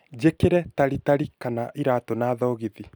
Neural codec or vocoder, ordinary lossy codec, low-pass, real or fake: none; none; none; real